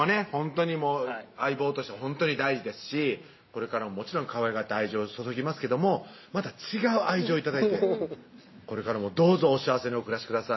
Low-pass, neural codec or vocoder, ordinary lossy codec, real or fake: 7.2 kHz; none; MP3, 24 kbps; real